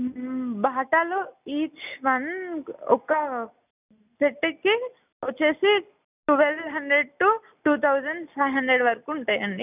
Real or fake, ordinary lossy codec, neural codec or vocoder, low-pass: real; none; none; 3.6 kHz